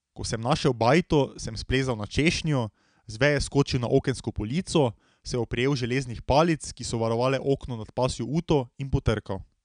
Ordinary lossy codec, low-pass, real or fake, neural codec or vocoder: none; 9.9 kHz; real; none